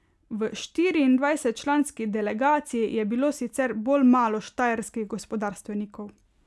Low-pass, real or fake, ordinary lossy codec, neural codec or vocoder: none; real; none; none